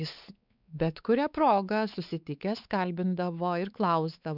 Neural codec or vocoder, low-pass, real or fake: codec, 16 kHz, 4 kbps, X-Codec, WavLM features, trained on Multilingual LibriSpeech; 5.4 kHz; fake